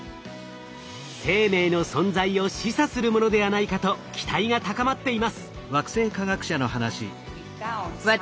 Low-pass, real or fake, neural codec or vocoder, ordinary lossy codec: none; real; none; none